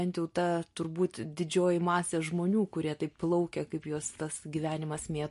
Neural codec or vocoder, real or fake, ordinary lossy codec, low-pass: none; real; MP3, 48 kbps; 14.4 kHz